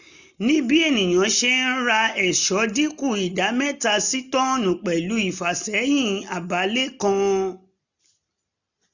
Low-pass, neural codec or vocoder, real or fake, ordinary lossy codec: 7.2 kHz; none; real; none